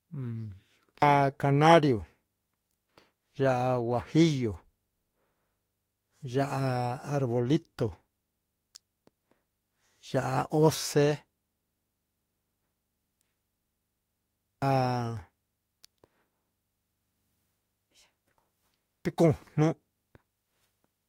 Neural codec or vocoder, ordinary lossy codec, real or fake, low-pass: autoencoder, 48 kHz, 32 numbers a frame, DAC-VAE, trained on Japanese speech; AAC, 48 kbps; fake; 19.8 kHz